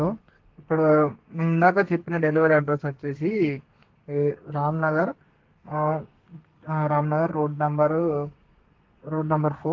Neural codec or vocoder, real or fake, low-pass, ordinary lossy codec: codec, 32 kHz, 1.9 kbps, SNAC; fake; 7.2 kHz; Opus, 16 kbps